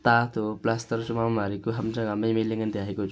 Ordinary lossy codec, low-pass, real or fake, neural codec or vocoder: none; none; real; none